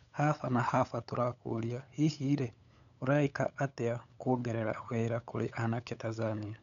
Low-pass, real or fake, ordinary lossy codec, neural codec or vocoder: 7.2 kHz; fake; none; codec, 16 kHz, 8 kbps, FunCodec, trained on LibriTTS, 25 frames a second